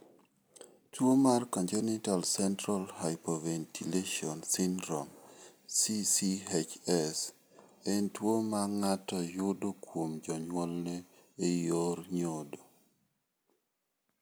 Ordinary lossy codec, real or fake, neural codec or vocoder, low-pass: none; real; none; none